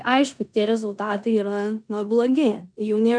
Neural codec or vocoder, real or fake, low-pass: codec, 16 kHz in and 24 kHz out, 0.9 kbps, LongCat-Audio-Codec, four codebook decoder; fake; 9.9 kHz